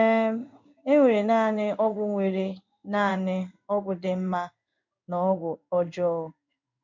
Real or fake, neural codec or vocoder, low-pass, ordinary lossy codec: fake; codec, 16 kHz in and 24 kHz out, 1 kbps, XY-Tokenizer; 7.2 kHz; none